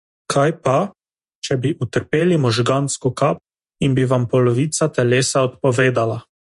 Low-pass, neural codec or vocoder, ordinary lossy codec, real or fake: 14.4 kHz; vocoder, 48 kHz, 128 mel bands, Vocos; MP3, 48 kbps; fake